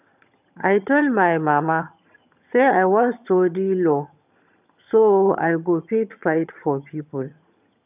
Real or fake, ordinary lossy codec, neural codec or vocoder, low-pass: fake; none; vocoder, 22.05 kHz, 80 mel bands, HiFi-GAN; 3.6 kHz